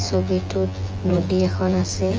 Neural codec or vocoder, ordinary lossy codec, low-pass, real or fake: vocoder, 24 kHz, 100 mel bands, Vocos; Opus, 24 kbps; 7.2 kHz; fake